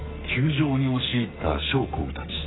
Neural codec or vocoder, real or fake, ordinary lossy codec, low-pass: codec, 44.1 kHz, 2.6 kbps, SNAC; fake; AAC, 16 kbps; 7.2 kHz